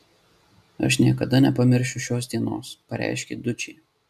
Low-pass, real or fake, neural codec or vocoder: 14.4 kHz; real; none